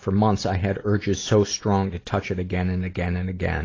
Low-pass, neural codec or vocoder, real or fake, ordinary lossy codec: 7.2 kHz; none; real; AAC, 32 kbps